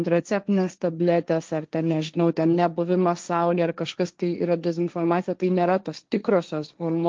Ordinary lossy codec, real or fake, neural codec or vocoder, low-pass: Opus, 24 kbps; fake; codec, 16 kHz, 1.1 kbps, Voila-Tokenizer; 7.2 kHz